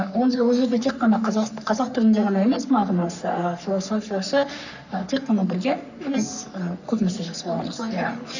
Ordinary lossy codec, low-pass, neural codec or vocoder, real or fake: none; 7.2 kHz; codec, 44.1 kHz, 3.4 kbps, Pupu-Codec; fake